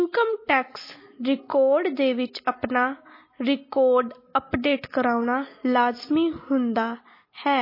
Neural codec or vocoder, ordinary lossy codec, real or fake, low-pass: none; MP3, 24 kbps; real; 5.4 kHz